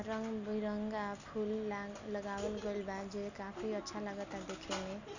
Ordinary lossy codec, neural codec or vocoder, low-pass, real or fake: none; none; 7.2 kHz; real